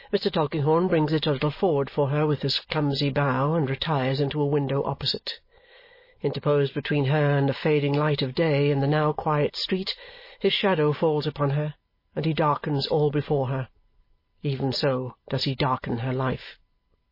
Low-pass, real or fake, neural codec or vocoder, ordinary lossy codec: 5.4 kHz; real; none; MP3, 24 kbps